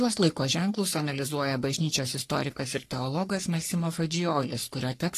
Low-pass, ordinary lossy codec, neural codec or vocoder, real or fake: 14.4 kHz; AAC, 48 kbps; codec, 44.1 kHz, 3.4 kbps, Pupu-Codec; fake